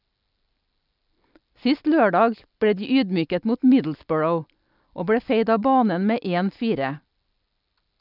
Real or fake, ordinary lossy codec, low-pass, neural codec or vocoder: real; none; 5.4 kHz; none